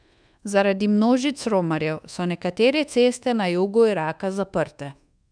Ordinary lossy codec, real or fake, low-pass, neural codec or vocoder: none; fake; 9.9 kHz; codec, 24 kHz, 1.2 kbps, DualCodec